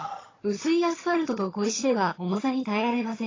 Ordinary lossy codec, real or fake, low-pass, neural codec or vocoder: AAC, 32 kbps; fake; 7.2 kHz; vocoder, 22.05 kHz, 80 mel bands, HiFi-GAN